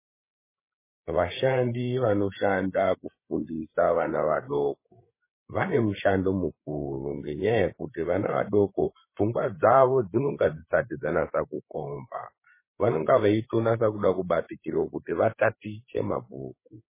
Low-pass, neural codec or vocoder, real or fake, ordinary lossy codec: 3.6 kHz; codec, 16 kHz, 8 kbps, FreqCodec, larger model; fake; MP3, 16 kbps